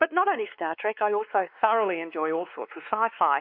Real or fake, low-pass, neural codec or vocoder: fake; 5.4 kHz; codec, 16 kHz, 2 kbps, X-Codec, WavLM features, trained on Multilingual LibriSpeech